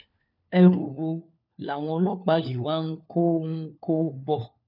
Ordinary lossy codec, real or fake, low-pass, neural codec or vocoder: none; fake; 5.4 kHz; codec, 16 kHz, 4 kbps, FunCodec, trained on LibriTTS, 50 frames a second